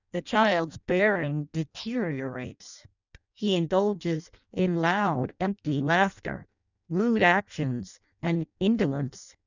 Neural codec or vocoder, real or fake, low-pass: codec, 16 kHz in and 24 kHz out, 0.6 kbps, FireRedTTS-2 codec; fake; 7.2 kHz